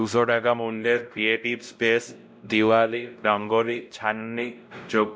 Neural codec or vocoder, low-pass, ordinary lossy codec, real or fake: codec, 16 kHz, 0.5 kbps, X-Codec, WavLM features, trained on Multilingual LibriSpeech; none; none; fake